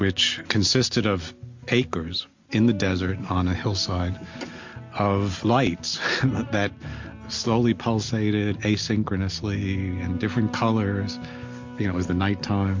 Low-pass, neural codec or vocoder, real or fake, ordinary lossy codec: 7.2 kHz; none; real; MP3, 48 kbps